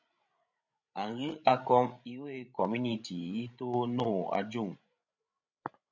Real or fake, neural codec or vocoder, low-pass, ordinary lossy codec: fake; codec, 16 kHz, 16 kbps, FreqCodec, larger model; 7.2 kHz; MP3, 64 kbps